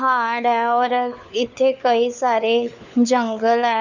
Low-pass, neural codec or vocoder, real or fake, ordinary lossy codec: 7.2 kHz; codec, 16 kHz, 4 kbps, FunCodec, trained on LibriTTS, 50 frames a second; fake; none